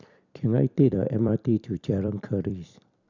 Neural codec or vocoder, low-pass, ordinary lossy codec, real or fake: vocoder, 44.1 kHz, 128 mel bands every 256 samples, BigVGAN v2; 7.2 kHz; none; fake